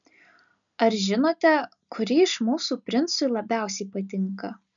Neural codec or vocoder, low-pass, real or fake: none; 7.2 kHz; real